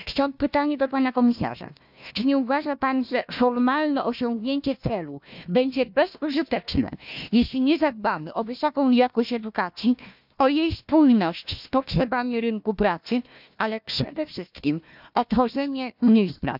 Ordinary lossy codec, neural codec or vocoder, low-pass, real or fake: none; codec, 16 kHz, 1 kbps, FunCodec, trained on Chinese and English, 50 frames a second; 5.4 kHz; fake